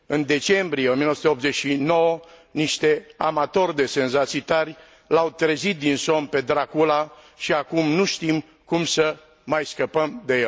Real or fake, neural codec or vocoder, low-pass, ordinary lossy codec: real; none; none; none